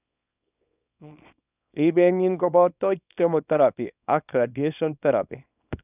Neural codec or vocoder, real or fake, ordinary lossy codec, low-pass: codec, 24 kHz, 0.9 kbps, WavTokenizer, small release; fake; none; 3.6 kHz